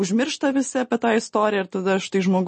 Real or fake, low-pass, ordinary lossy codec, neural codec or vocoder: real; 10.8 kHz; MP3, 32 kbps; none